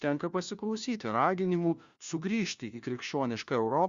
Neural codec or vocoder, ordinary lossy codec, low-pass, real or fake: codec, 16 kHz, 1 kbps, FunCodec, trained on LibriTTS, 50 frames a second; Opus, 64 kbps; 7.2 kHz; fake